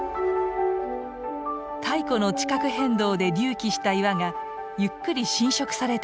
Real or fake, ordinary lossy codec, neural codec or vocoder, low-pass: real; none; none; none